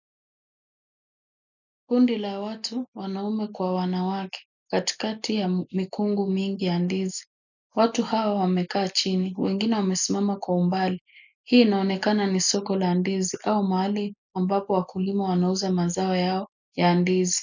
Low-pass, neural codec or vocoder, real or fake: 7.2 kHz; none; real